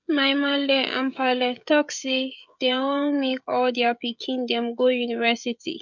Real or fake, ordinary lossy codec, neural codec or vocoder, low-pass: fake; none; codec, 16 kHz, 16 kbps, FreqCodec, smaller model; 7.2 kHz